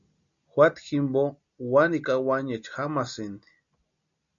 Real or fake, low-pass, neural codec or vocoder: real; 7.2 kHz; none